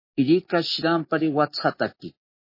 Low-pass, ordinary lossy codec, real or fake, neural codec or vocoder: 5.4 kHz; MP3, 24 kbps; real; none